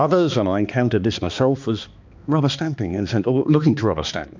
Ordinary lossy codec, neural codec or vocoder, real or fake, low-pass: MP3, 64 kbps; codec, 16 kHz, 2 kbps, X-Codec, HuBERT features, trained on balanced general audio; fake; 7.2 kHz